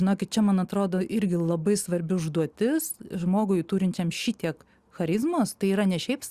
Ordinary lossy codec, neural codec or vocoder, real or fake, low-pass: Opus, 64 kbps; none; real; 14.4 kHz